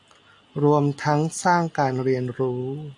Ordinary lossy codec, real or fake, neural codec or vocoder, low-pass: AAC, 64 kbps; real; none; 10.8 kHz